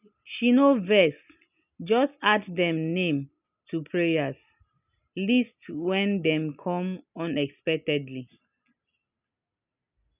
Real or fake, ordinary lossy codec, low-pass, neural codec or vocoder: real; none; 3.6 kHz; none